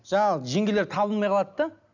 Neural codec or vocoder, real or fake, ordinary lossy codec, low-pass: none; real; none; 7.2 kHz